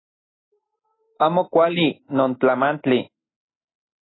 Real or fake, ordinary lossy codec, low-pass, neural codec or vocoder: real; AAC, 16 kbps; 7.2 kHz; none